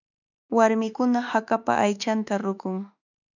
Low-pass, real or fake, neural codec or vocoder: 7.2 kHz; fake; autoencoder, 48 kHz, 32 numbers a frame, DAC-VAE, trained on Japanese speech